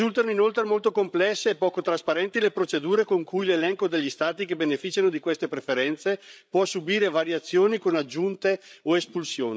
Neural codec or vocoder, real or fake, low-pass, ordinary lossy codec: codec, 16 kHz, 16 kbps, FreqCodec, larger model; fake; none; none